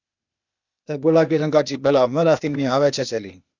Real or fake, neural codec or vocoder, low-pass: fake; codec, 16 kHz, 0.8 kbps, ZipCodec; 7.2 kHz